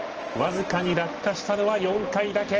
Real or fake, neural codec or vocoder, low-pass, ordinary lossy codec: real; none; 7.2 kHz; Opus, 16 kbps